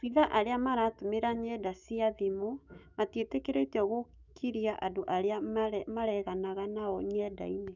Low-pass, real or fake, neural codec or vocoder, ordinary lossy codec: 7.2 kHz; fake; autoencoder, 48 kHz, 128 numbers a frame, DAC-VAE, trained on Japanese speech; none